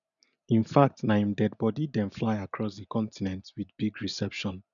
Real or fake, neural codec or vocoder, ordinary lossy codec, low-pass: real; none; none; 7.2 kHz